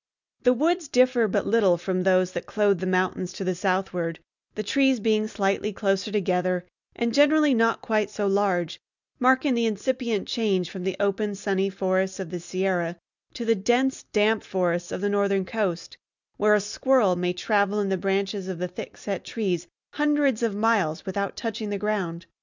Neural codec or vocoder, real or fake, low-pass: none; real; 7.2 kHz